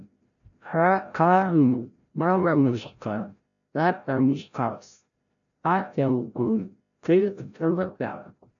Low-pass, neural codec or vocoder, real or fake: 7.2 kHz; codec, 16 kHz, 0.5 kbps, FreqCodec, larger model; fake